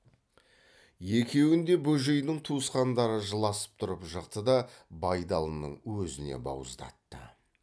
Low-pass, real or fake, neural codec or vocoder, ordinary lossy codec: none; real; none; none